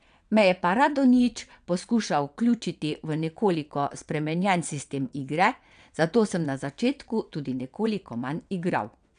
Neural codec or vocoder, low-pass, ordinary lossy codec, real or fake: vocoder, 22.05 kHz, 80 mel bands, WaveNeXt; 9.9 kHz; none; fake